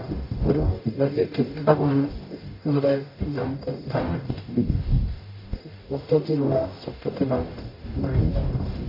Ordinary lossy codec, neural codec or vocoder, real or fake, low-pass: MP3, 32 kbps; codec, 44.1 kHz, 0.9 kbps, DAC; fake; 5.4 kHz